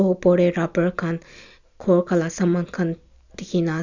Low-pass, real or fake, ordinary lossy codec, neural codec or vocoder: 7.2 kHz; real; none; none